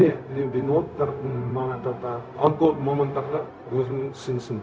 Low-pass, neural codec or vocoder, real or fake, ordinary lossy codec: none; codec, 16 kHz, 0.4 kbps, LongCat-Audio-Codec; fake; none